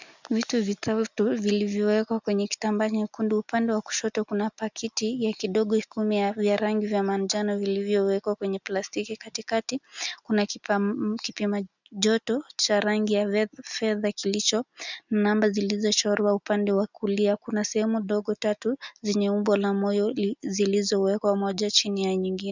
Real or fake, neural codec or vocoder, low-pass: real; none; 7.2 kHz